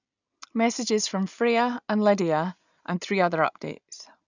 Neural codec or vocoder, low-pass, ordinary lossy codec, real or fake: none; 7.2 kHz; none; real